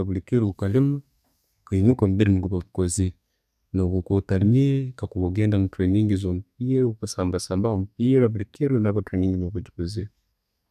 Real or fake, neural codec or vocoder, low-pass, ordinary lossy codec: fake; codec, 32 kHz, 1.9 kbps, SNAC; 14.4 kHz; none